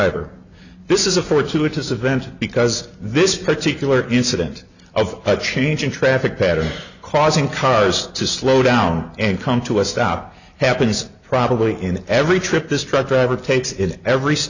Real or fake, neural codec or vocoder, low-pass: real; none; 7.2 kHz